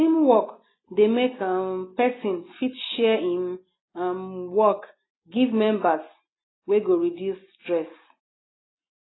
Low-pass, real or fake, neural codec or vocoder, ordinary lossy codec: 7.2 kHz; real; none; AAC, 16 kbps